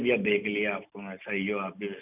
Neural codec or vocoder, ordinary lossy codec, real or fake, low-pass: none; none; real; 3.6 kHz